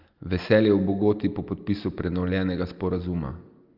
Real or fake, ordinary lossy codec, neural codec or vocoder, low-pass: real; Opus, 24 kbps; none; 5.4 kHz